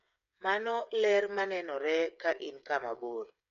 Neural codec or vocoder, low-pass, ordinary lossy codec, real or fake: codec, 16 kHz, 8 kbps, FreqCodec, smaller model; 7.2 kHz; none; fake